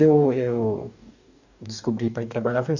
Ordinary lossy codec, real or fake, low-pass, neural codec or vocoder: none; fake; 7.2 kHz; codec, 44.1 kHz, 2.6 kbps, DAC